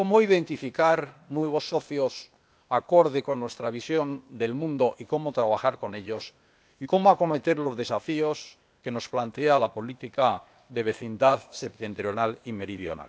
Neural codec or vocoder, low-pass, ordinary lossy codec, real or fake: codec, 16 kHz, 0.8 kbps, ZipCodec; none; none; fake